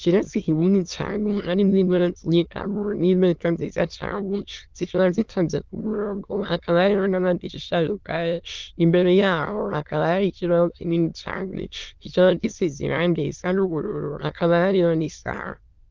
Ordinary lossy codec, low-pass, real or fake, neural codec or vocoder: Opus, 32 kbps; 7.2 kHz; fake; autoencoder, 22.05 kHz, a latent of 192 numbers a frame, VITS, trained on many speakers